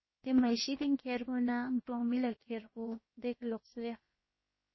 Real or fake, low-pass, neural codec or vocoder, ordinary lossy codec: fake; 7.2 kHz; codec, 16 kHz, about 1 kbps, DyCAST, with the encoder's durations; MP3, 24 kbps